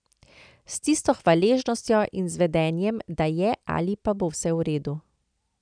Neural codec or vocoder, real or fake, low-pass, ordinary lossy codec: none; real; 9.9 kHz; none